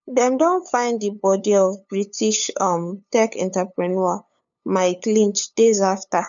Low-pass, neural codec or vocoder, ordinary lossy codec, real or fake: 7.2 kHz; codec, 16 kHz, 8 kbps, FunCodec, trained on LibriTTS, 25 frames a second; none; fake